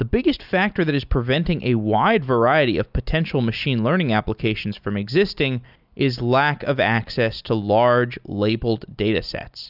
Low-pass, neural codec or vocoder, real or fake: 5.4 kHz; none; real